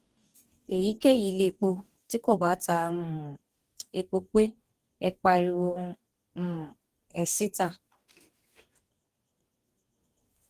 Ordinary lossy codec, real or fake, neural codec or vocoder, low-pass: Opus, 24 kbps; fake; codec, 44.1 kHz, 2.6 kbps, DAC; 14.4 kHz